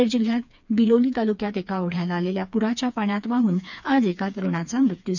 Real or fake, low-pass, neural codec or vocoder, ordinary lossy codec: fake; 7.2 kHz; codec, 16 kHz, 4 kbps, FreqCodec, smaller model; none